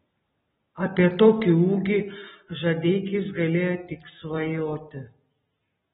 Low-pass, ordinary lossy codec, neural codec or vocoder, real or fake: 19.8 kHz; AAC, 16 kbps; none; real